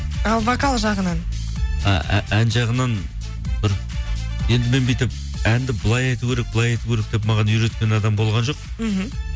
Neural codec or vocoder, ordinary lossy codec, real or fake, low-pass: none; none; real; none